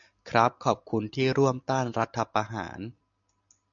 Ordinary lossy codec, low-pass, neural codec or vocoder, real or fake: MP3, 64 kbps; 7.2 kHz; none; real